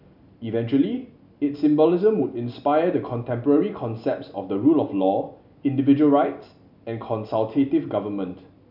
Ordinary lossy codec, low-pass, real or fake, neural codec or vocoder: none; 5.4 kHz; real; none